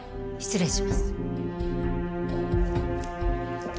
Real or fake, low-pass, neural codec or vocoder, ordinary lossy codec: real; none; none; none